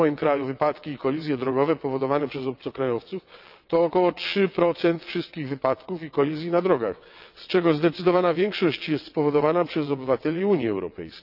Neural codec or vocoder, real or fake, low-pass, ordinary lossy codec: vocoder, 22.05 kHz, 80 mel bands, WaveNeXt; fake; 5.4 kHz; none